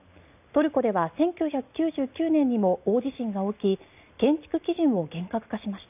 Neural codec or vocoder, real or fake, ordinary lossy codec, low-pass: none; real; none; 3.6 kHz